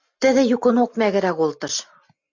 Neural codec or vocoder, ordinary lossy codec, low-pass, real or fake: none; MP3, 64 kbps; 7.2 kHz; real